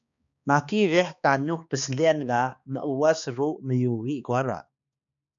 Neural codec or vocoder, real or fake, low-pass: codec, 16 kHz, 2 kbps, X-Codec, HuBERT features, trained on balanced general audio; fake; 7.2 kHz